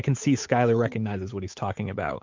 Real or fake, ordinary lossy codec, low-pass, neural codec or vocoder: fake; MP3, 48 kbps; 7.2 kHz; codec, 16 kHz, 8 kbps, FunCodec, trained on Chinese and English, 25 frames a second